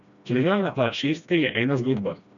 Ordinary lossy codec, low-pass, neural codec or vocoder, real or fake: none; 7.2 kHz; codec, 16 kHz, 1 kbps, FreqCodec, smaller model; fake